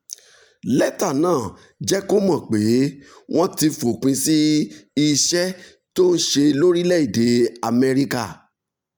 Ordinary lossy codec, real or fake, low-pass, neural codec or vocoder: none; real; none; none